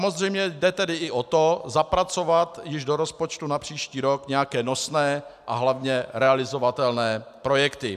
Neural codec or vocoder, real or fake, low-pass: none; real; 14.4 kHz